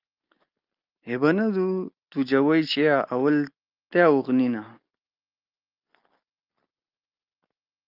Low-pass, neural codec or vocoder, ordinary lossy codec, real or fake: 5.4 kHz; none; Opus, 24 kbps; real